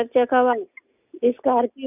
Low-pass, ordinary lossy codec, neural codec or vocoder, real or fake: 3.6 kHz; none; none; real